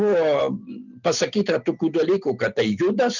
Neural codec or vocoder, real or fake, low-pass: none; real; 7.2 kHz